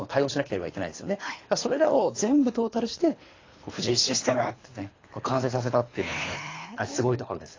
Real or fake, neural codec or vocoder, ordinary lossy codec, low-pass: fake; codec, 24 kHz, 3 kbps, HILCodec; AAC, 32 kbps; 7.2 kHz